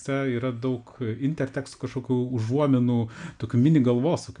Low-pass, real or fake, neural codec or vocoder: 9.9 kHz; real; none